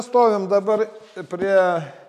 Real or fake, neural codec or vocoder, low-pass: real; none; 14.4 kHz